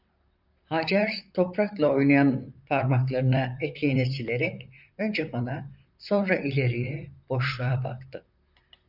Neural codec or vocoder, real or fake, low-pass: vocoder, 44.1 kHz, 128 mel bands, Pupu-Vocoder; fake; 5.4 kHz